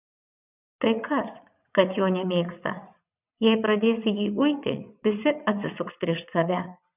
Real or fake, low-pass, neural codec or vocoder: fake; 3.6 kHz; vocoder, 22.05 kHz, 80 mel bands, WaveNeXt